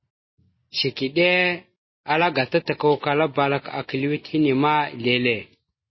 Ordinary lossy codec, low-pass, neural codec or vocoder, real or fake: MP3, 24 kbps; 7.2 kHz; none; real